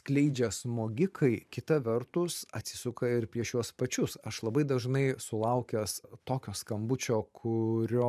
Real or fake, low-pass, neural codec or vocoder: fake; 14.4 kHz; vocoder, 44.1 kHz, 128 mel bands every 512 samples, BigVGAN v2